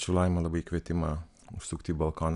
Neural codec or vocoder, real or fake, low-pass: none; real; 10.8 kHz